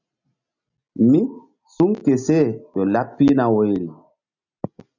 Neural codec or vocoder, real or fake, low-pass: none; real; 7.2 kHz